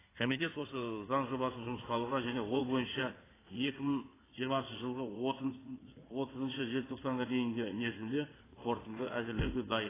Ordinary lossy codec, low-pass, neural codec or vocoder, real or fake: AAC, 16 kbps; 3.6 kHz; codec, 16 kHz in and 24 kHz out, 2.2 kbps, FireRedTTS-2 codec; fake